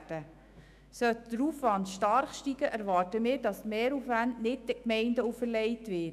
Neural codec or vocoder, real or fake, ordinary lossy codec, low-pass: autoencoder, 48 kHz, 128 numbers a frame, DAC-VAE, trained on Japanese speech; fake; none; 14.4 kHz